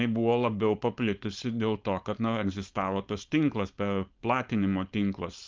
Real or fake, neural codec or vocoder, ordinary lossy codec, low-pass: fake; codec, 16 kHz, 4.8 kbps, FACodec; Opus, 24 kbps; 7.2 kHz